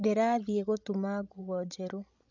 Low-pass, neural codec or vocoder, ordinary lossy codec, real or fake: 7.2 kHz; codec, 16 kHz, 16 kbps, FreqCodec, larger model; none; fake